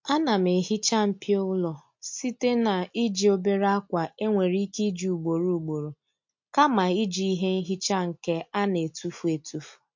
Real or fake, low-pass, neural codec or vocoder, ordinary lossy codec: real; 7.2 kHz; none; MP3, 48 kbps